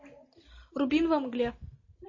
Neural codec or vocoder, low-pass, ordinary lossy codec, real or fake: none; 7.2 kHz; MP3, 32 kbps; real